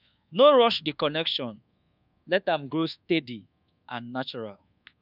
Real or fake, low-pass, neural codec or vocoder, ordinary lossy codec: fake; 5.4 kHz; codec, 24 kHz, 1.2 kbps, DualCodec; none